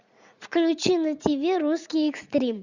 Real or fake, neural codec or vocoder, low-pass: fake; vocoder, 44.1 kHz, 80 mel bands, Vocos; 7.2 kHz